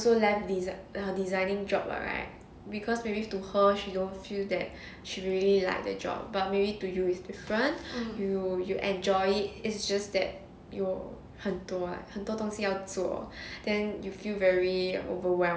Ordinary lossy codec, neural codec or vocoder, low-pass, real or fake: none; none; none; real